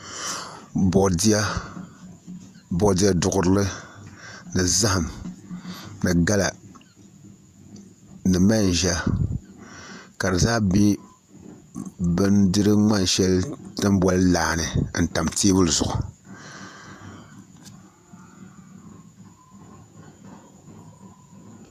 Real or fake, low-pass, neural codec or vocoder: fake; 14.4 kHz; vocoder, 48 kHz, 128 mel bands, Vocos